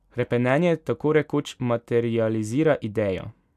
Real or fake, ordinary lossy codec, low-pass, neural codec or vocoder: real; none; 14.4 kHz; none